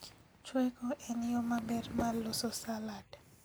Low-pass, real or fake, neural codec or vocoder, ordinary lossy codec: none; real; none; none